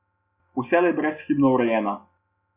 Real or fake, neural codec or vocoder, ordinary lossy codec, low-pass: real; none; Opus, 64 kbps; 3.6 kHz